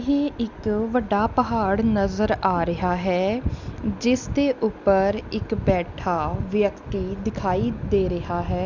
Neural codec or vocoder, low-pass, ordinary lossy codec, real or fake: none; 7.2 kHz; Opus, 64 kbps; real